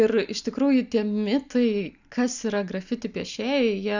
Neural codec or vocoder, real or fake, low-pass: none; real; 7.2 kHz